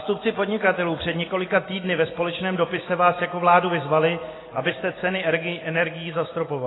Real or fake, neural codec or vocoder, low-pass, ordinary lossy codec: real; none; 7.2 kHz; AAC, 16 kbps